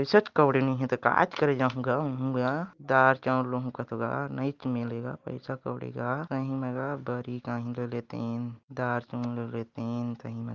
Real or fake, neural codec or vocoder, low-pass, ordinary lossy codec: real; none; 7.2 kHz; Opus, 32 kbps